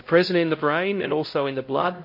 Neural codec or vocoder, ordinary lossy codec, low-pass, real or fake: codec, 16 kHz, 0.5 kbps, X-Codec, HuBERT features, trained on LibriSpeech; MP3, 32 kbps; 5.4 kHz; fake